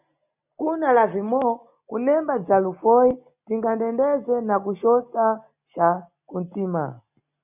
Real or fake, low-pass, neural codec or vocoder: real; 3.6 kHz; none